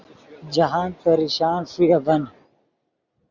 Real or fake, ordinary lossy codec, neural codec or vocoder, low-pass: real; Opus, 64 kbps; none; 7.2 kHz